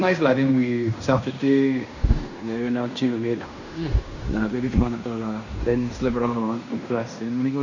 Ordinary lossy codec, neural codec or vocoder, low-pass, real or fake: none; codec, 16 kHz in and 24 kHz out, 0.9 kbps, LongCat-Audio-Codec, fine tuned four codebook decoder; 7.2 kHz; fake